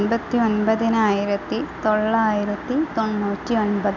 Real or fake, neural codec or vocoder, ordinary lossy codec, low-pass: real; none; none; 7.2 kHz